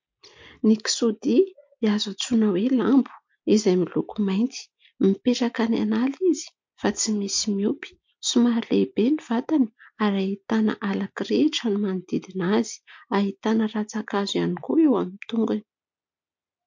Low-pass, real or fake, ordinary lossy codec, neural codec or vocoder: 7.2 kHz; real; MP3, 48 kbps; none